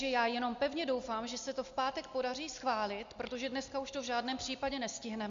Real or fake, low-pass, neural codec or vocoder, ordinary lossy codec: real; 7.2 kHz; none; Opus, 64 kbps